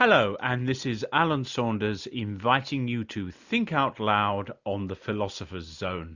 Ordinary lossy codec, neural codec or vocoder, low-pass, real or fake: Opus, 64 kbps; none; 7.2 kHz; real